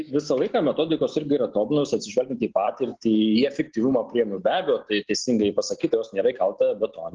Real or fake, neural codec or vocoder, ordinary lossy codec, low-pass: real; none; Opus, 16 kbps; 7.2 kHz